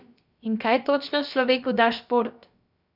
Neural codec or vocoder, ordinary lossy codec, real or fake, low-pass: codec, 16 kHz, about 1 kbps, DyCAST, with the encoder's durations; none; fake; 5.4 kHz